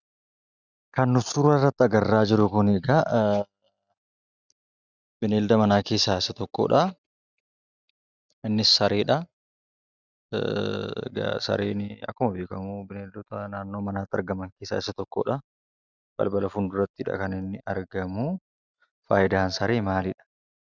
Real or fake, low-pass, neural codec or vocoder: real; 7.2 kHz; none